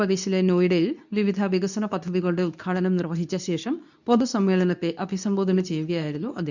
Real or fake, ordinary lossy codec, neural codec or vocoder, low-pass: fake; none; codec, 24 kHz, 0.9 kbps, WavTokenizer, medium speech release version 2; 7.2 kHz